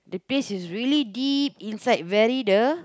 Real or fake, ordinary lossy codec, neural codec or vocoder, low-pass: real; none; none; none